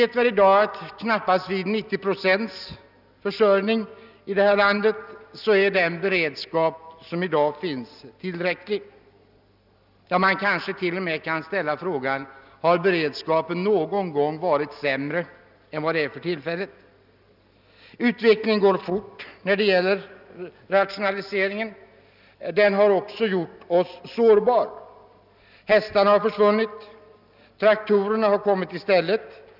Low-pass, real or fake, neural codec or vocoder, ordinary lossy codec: 5.4 kHz; real; none; none